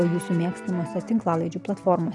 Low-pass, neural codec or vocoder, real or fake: 10.8 kHz; none; real